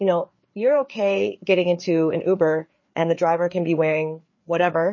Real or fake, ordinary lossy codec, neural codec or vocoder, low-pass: fake; MP3, 32 kbps; codec, 16 kHz, 4 kbps, FreqCodec, larger model; 7.2 kHz